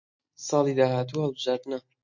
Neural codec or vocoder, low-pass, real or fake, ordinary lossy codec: none; 7.2 kHz; real; MP3, 48 kbps